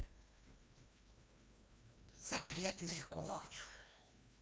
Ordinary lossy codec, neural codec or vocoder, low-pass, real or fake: none; codec, 16 kHz, 1 kbps, FreqCodec, larger model; none; fake